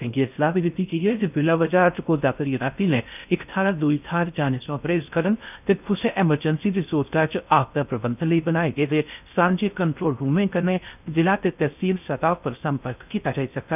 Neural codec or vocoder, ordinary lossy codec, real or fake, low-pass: codec, 16 kHz in and 24 kHz out, 0.6 kbps, FocalCodec, streaming, 4096 codes; none; fake; 3.6 kHz